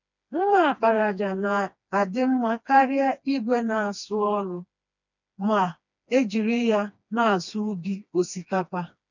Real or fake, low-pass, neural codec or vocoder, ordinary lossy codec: fake; 7.2 kHz; codec, 16 kHz, 2 kbps, FreqCodec, smaller model; none